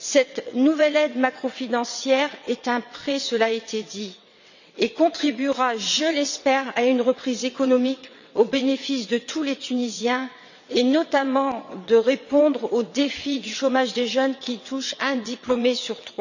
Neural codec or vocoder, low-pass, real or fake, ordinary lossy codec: vocoder, 22.05 kHz, 80 mel bands, WaveNeXt; 7.2 kHz; fake; none